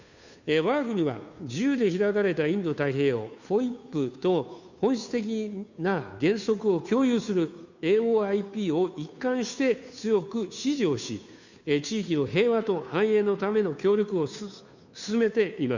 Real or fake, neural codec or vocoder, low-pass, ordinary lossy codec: fake; codec, 16 kHz, 2 kbps, FunCodec, trained on Chinese and English, 25 frames a second; 7.2 kHz; none